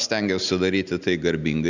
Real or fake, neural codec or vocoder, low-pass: real; none; 7.2 kHz